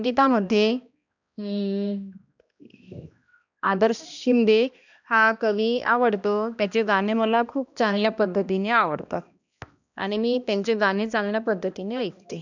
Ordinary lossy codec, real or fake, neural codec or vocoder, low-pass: none; fake; codec, 16 kHz, 1 kbps, X-Codec, HuBERT features, trained on balanced general audio; 7.2 kHz